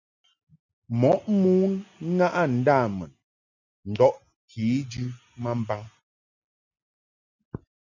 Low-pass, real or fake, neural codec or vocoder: 7.2 kHz; real; none